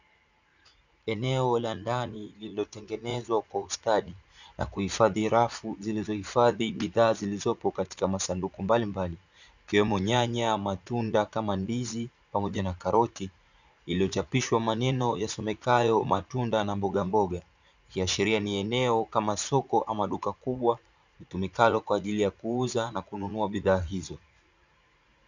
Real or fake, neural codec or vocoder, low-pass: fake; vocoder, 44.1 kHz, 80 mel bands, Vocos; 7.2 kHz